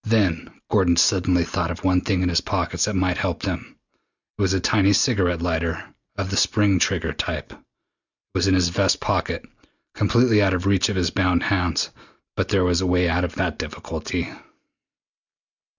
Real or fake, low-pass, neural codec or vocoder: real; 7.2 kHz; none